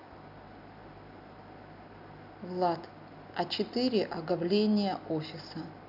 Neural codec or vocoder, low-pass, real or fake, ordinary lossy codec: none; 5.4 kHz; real; none